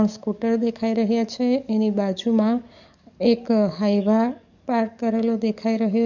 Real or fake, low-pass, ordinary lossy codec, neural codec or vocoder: fake; 7.2 kHz; none; codec, 44.1 kHz, 7.8 kbps, DAC